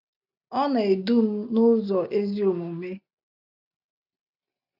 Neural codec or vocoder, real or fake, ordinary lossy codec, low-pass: none; real; none; 5.4 kHz